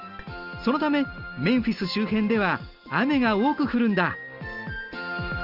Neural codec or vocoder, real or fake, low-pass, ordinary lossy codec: none; real; 5.4 kHz; Opus, 24 kbps